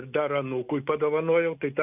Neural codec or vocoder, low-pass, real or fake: none; 3.6 kHz; real